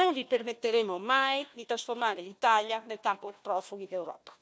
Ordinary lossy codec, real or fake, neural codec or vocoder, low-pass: none; fake; codec, 16 kHz, 1 kbps, FunCodec, trained on Chinese and English, 50 frames a second; none